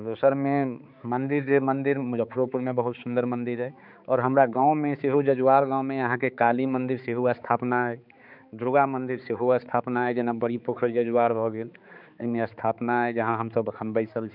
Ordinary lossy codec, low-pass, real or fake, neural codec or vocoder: none; 5.4 kHz; fake; codec, 16 kHz, 4 kbps, X-Codec, HuBERT features, trained on balanced general audio